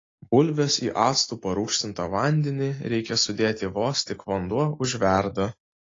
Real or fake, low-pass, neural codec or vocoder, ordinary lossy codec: real; 7.2 kHz; none; AAC, 32 kbps